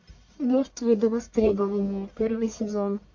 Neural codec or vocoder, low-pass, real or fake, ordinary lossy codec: codec, 44.1 kHz, 1.7 kbps, Pupu-Codec; 7.2 kHz; fake; MP3, 48 kbps